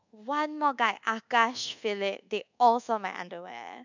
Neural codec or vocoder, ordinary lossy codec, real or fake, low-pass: codec, 24 kHz, 1.2 kbps, DualCodec; none; fake; 7.2 kHz